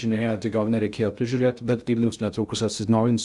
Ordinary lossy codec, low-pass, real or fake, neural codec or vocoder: Opus, 64 kbps; 10.8 kHz; fake; codec, 16 kHz in and 24 kHz out, 0.6 kbps, FocalCodec, streaming, 2048 codes